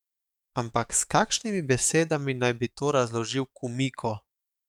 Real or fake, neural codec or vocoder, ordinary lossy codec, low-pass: fake; codec, 44.1 kHz, 7.8 kbps, DAC; none; 19.8 kHz